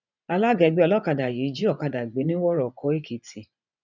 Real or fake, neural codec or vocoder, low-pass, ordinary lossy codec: fake; vocoder, 24 kHz, 100 mel bands, Vocos; 7.2 kHz; none